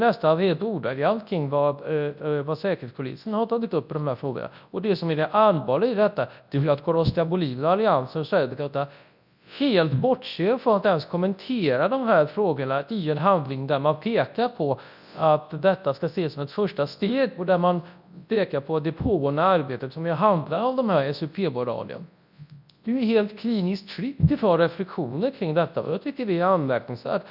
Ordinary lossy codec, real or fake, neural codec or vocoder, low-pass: none; fake; codec, 24 kHz, 0.9 kbps, WavTokenizer, large speech release; 5.4 kHz